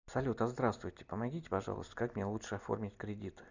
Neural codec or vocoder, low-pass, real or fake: none; 7.2 kHz; real